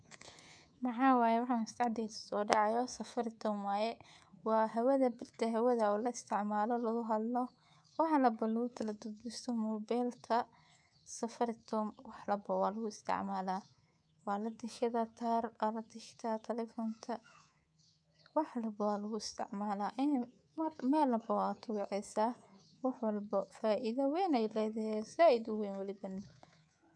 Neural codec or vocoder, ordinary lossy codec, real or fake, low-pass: codec, 24 kHz, 3.1 kbps, DualCodec; none; fake; 9.9 kHz